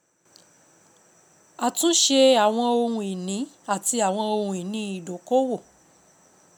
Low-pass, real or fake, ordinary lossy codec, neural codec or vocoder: none; real; none; none